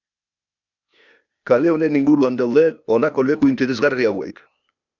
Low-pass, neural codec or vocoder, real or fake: 7.2 kHz; codec, 16 kHz, 0.8 kbps, ZipCodec; fake